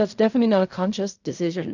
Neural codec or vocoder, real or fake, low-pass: codec, 16 kHz in and 24 kHz out, 0.4 kbps, LongCat-Audio-Codec, fine tuned four codebook decoder; fake; 7.2 kHz